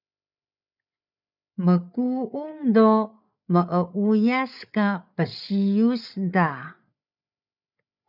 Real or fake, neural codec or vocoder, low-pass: fake; codec, 16 kHz, 8 kbps, FreqCodec, larger model; 5.4 kHz